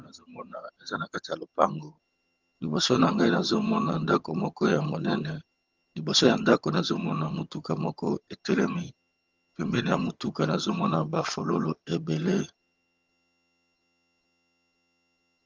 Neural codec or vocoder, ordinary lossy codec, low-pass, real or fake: vocoder, 22.05 kHz, 80 mel bands, HiFi-GAN; Opus, 32 kbps; 7.2 kHz; fake